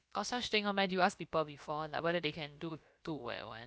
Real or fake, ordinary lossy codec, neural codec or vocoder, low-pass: fake; none; codec, 16 kHz, about 1 kbps, DyCAST, with the encoder's durations; none